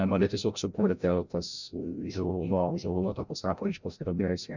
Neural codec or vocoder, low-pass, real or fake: codec, 16 kHz, 0.5 kbps, FreqCodec, larger model; 7.2 kHz; fake